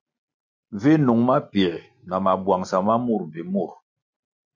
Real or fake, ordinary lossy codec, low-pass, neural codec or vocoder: real; MP3, 64 kbps; 7.2 kHz; none